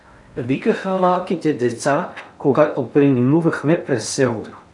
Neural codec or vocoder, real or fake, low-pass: codec, 16 kHz in and 24 kHz out, 0.6 kbps, FocalCodec, streaming, 4096 codes; fake; 10.8 kHz